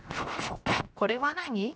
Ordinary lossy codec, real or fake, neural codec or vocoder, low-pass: none; fake; codec, 16 kHz, 0.7 kbps, FocalCodec; none